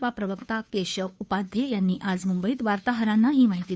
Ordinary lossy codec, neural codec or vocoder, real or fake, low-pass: none; codec, 16 kHz, 2 kbps, FunCodec, trained on Chinese and English, 25 frames a second; fake; none